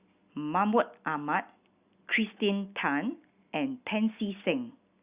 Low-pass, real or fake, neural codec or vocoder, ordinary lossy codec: 3.6 kHz; real; none; Opus, 64 kbps